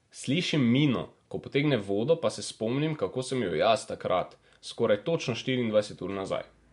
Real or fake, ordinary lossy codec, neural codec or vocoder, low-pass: real; MP3, 64 kbps; none; 10.8 kHz